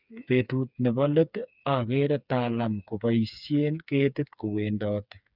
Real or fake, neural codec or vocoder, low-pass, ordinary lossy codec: fake; codec, 16 kHz, 4 kbps, FreqCodec, smaller model; 5.4 kHz; none